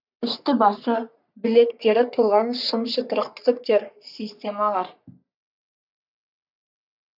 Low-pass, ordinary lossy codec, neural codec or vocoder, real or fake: 5.4 kHz; none; codec, 44.1 kHz, 3.4 kbps, Pupu-Codec; fake